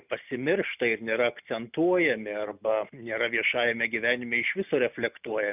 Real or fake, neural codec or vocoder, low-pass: real; none; 3.6 kHz